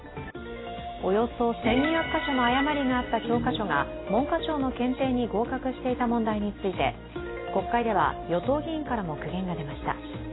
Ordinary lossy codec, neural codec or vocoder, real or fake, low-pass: AAC, 16 kbps; none; real; 7.2 kHz